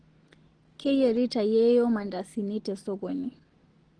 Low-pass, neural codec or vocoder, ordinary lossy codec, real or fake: 9.9 kHz; none; Opus, 16 kbps; real